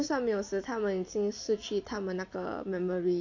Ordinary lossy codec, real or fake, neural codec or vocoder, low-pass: AAC, 48 kbps; real; none; 7.2 kHz